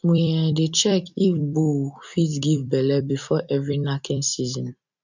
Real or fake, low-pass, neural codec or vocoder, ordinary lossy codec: fake; 7.2 kHz; vocoder, 44.1 kHz, 128 mel bands every 256 samples, BigVGAN v2; none